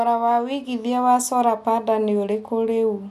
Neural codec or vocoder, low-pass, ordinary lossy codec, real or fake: none; 14.4 kHz; none; real